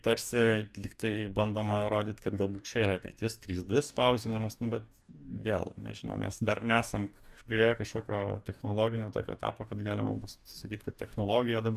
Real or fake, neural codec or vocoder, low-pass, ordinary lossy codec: fake; codec, 44.1 kHz, 2.6 kbps, DAC; 14.4 kHz; Opus, 64 kbps